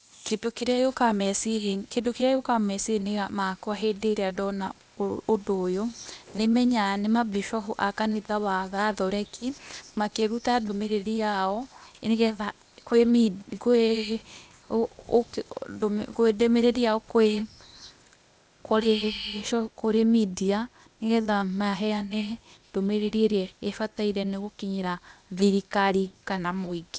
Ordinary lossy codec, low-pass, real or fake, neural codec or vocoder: none; none; fake; codec, 16 kHz, 0.8 kbps, ZipCodec